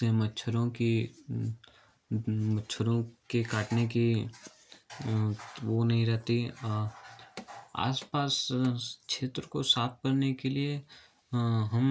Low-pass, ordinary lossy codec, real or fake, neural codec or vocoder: none; none; real; none